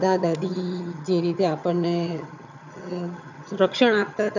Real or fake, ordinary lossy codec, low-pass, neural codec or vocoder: fake; none; 7.2 kHz; vocoder, 22.05 kHz, 80 mel bands, HiFi-GAN